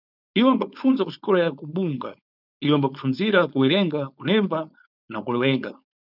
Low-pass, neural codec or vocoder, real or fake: 5.4 kHz; codec, 16 kHz, 4.8 kbps, FACodec; fake